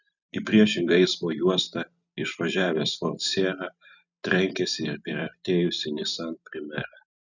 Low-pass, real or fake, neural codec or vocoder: 7.2 kHz; real; none